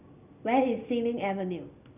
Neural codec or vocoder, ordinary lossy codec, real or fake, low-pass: none; none; real; 3.6 kHz